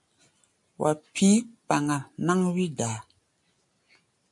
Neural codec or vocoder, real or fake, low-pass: none; real; 10.8 kHz